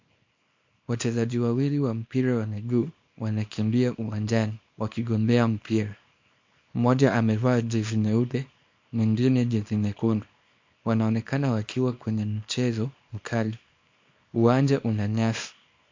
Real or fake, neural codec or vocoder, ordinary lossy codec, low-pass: fake; codec, 24 kHz, 0.9 kbps, WavTokenizer, small release; MP3, 48 kbps; 7.2 kHz